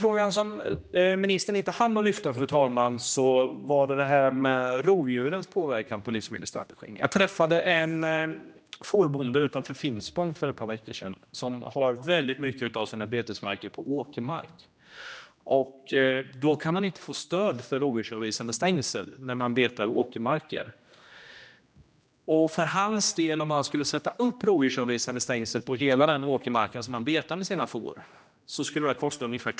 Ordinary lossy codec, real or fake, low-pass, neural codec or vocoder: none; fake; none; codec, 16 kHz, 1 kbps, X-Codec, HuBERT features, trained on general audio